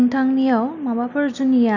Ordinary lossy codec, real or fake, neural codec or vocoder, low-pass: none; real; none; 7.2 kHz